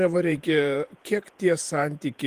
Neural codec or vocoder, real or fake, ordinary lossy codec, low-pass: vocoder, 48 kHz, 128 mel bands, Vocos; fake; Opus, 24 kbps; 14.4 kHz